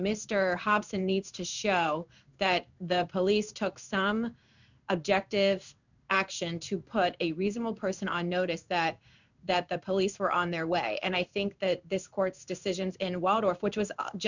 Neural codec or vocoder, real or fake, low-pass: codec, 16 kHz in and 24 kHz out, 1 kbps, XY-Tokenizer; fake; 7.2 kHz